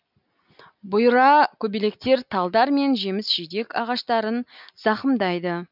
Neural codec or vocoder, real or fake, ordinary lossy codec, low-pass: none; real; none; 5.4 kHz